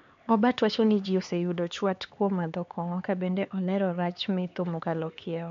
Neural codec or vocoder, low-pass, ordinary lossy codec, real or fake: codec, 16 kHz, 4 kbps, X-Codec, HuBERT features, trained on LibriSpeech; 7.2 kHz; MP3, 48 kbps; fake